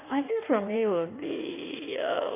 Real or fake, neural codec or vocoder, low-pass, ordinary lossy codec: fake; codec, 16 kHz in and 24 kHz out, 1.1 kbps, FireRedTTS-2 codec; 3.6 kHz; none